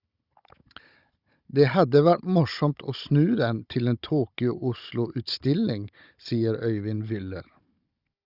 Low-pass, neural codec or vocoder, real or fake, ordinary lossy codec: 5.4 kHz; codec, 16 kHz, 16 kbps, FunCodec, trained on Chinese and English, 50 frames a second; fake; Opus, 64 kbps